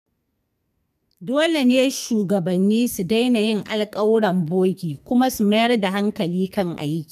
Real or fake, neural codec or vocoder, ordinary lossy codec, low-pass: fake; codec, 44.1 kHz, 2.6 kbps, SNAC; none; 14.4 kHz